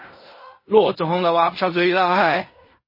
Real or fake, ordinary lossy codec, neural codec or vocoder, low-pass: fake; MP3, 24 kbps; codec, 16 kHz in and 24 kHz out, 0.4 kbps, LongCat-Audio-Codec, fine tuned four codebook decoder; 5.4 kHz